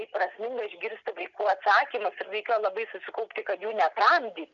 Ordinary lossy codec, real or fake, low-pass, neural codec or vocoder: MP3, 96 kbps; real; 7.2 kHz; none